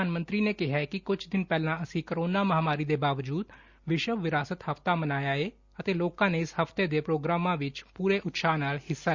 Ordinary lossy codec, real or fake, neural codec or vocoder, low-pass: Opus, 64 kbps; real; none; 7.2 kHz